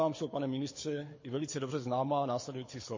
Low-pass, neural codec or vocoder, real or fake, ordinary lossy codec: 7.2 kHz; codec, 24 kHz, 6 kbps, HILCodec; fake; MP3, 32 kbps